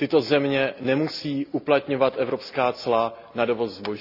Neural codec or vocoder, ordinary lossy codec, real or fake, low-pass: none; none; real; 5.4 kHz